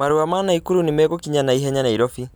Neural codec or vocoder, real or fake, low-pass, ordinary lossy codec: none; real; none; none